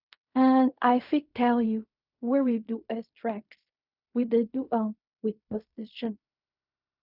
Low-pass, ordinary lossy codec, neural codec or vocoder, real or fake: 5.4 kHz; none; codec, 16 kHz in and 24 kHz out, 0.4 kbps, LongCat-Audio-Codec, fine tuned four codebook decoder; fake